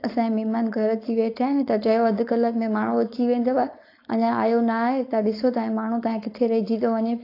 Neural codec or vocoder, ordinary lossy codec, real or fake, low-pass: codec, 16 kHz, 4.8 kbps, FACodec; AAC, 32 kbps; fake; 5.4 kHz